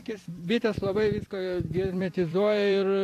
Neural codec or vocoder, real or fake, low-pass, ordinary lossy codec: vocoder, 44.1 kHz, 128 mel bands every 256 samples, BigVGAN v2; fake; 14.4 kHz; AAC, 64 kbps